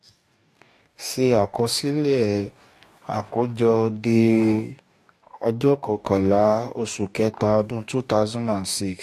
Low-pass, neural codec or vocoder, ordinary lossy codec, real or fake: 14.4 kHz; codec, 44.1 kHz, 2.6 kbps, DAC; AAC, 64 kbps; fake